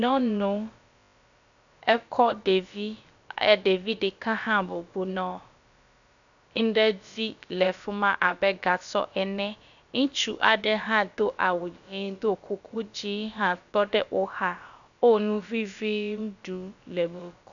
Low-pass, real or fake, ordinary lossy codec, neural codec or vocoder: 7.2 kHz; fake; MP3, 96 kbps; codec, 16 kHz, about 1 kbps, DyCAST, with the encoder's durations